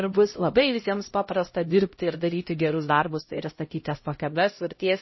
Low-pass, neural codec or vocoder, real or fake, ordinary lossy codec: 7.2 kHz; codec, 16 kHz, 0.5 kbps, X-Codec, HuBERT features, trained on LibriSpeech; fake; MP3, 24 kbps